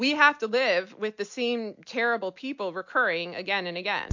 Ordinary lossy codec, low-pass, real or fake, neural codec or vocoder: MP3, 48 kbps; 7.2 kHz; real; none